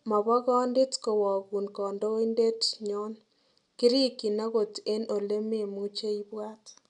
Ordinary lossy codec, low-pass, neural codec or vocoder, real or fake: none; 10.8 kHz; none; real